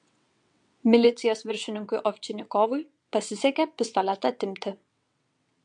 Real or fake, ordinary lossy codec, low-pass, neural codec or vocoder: fake; MP3, 64 kbps; 9.9 kHz; vocoder, 22.05 kHz, 80 mel bands, WaveNeXt